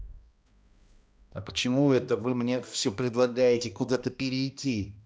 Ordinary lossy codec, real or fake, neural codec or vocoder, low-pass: none; fake; codec, 16 kHz, 1 kbps, X-Codec, HuBERT features, trained on balanced general audio; none